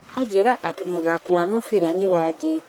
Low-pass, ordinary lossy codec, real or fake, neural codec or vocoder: none; none; fake; codec, 44.1 kHz, 1.7 kbps, Pupu-Codec